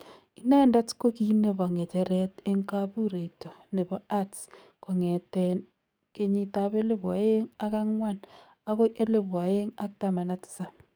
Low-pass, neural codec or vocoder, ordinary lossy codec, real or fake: none; codec, 44.1 kHz, 7.8 kbps, DAC; none; fake